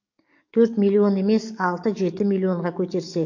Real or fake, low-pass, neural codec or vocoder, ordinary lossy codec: fake; 7.2 kHz; codec, 44.1 kHz, 7.8 kbps, DAC; MP3, 48 kbps